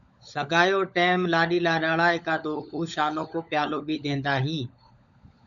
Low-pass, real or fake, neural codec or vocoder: 7.2 kHz; fake; codec, 16 kHz, 16 kbps, FunCodec, trained on LibriTTS, 50 frames a second